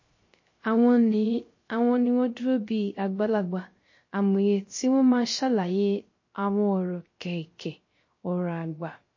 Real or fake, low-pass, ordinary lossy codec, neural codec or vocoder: fake; 7.2 kHz; MP3, 32 kbps; codec, 16 kHz, 0.3 kbps, FocalCodec